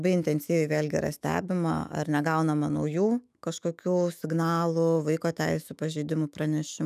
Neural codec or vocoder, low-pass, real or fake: autoencoder, 48 kHz, 128 numbers a frame, DAC-VAE, trained on Japanese speech; 14.4 kHz; fake